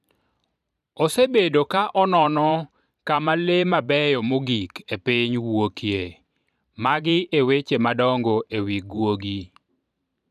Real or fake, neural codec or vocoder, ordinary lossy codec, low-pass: fake; vocoder, 44.1 kHz, 128 mel bands every 512 samples, BigVGAN v2; none; 14.4 kHz